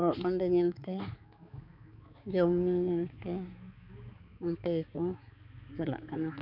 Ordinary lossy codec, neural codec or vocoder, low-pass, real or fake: none; codec, 16 kHz, 4 kbps, X-Codec, HuBERT features, trained on balanced general audio; 5.4 kHz; fake